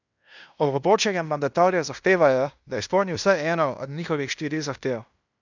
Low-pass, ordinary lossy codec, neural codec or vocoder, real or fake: 7.2 kHz; none; codec, 16 kHz in and 24 kHz out, 0.9 kbps, LongCat-Audio-Codec, fine tuned four codebook decoder; fake